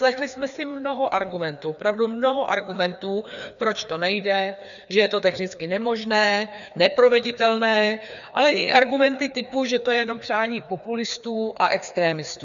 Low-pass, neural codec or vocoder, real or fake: 7.2 kHz; codec, 16 kHz, 2 kbps, FreqCodec, larger model; fake